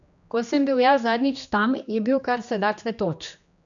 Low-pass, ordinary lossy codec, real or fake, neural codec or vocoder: 7.2 kHz; none; fake; codec, 16 kHz, 2 kbps, X-Codec, HuBERT features, trained on general audio